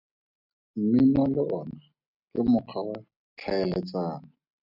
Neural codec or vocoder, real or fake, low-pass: none; real; 5.4 kHz